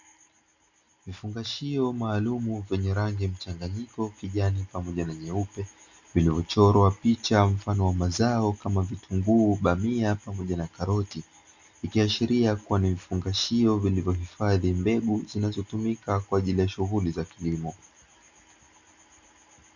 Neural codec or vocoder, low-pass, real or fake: none; 7.2 kHz; real